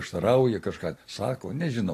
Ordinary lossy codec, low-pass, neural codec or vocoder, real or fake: AAC, 48 kbps; 14.4 kHz; none; real